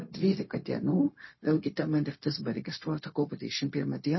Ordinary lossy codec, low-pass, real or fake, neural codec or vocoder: MP3, 24 kbps; 7.2 kHz; fake; codec, 16 kHz, 0.4 kbps, LongCat-Audio-Codec